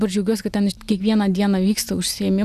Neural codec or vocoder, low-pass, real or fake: none; 14.4 kHz; real